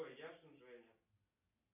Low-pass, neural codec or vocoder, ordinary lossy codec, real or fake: 3.6 kHz; none; MP3, 32 kbps; real